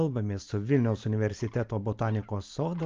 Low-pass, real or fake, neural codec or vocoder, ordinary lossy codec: 7.2 kHz; real; none; Opus, 32 kbps